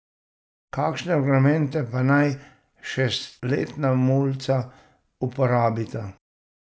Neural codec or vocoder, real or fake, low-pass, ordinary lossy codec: none; real; none; none